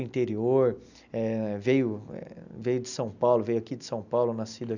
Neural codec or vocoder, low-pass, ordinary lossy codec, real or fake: none; 7.2 kHz; none; real